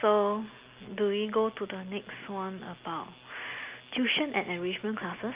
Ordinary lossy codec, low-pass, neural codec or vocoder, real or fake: Opus, 32 kbps; 3.6 kHz; none; real